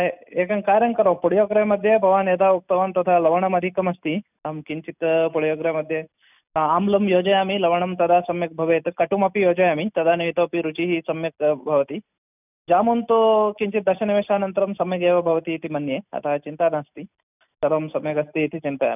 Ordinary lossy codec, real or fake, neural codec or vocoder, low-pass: none; real; none; 3.6 kHz